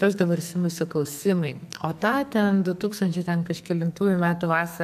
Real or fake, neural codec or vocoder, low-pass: fake; codec, 44.1 kHz, 2.6 kbps, SNAC; 14.4 kHz